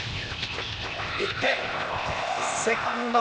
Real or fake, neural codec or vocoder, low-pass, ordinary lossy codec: fake; codec, 16 kHz, 0.8 kbps, ZipCodec; none; none